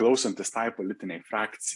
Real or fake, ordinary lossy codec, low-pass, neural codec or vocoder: real; AAC, 64 kbps; 10.8 kHz; none